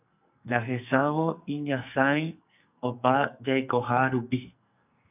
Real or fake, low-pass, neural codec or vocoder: fake; 3.6 kHz; codec, 44.1 kHz, 2.6 kbps, SNAC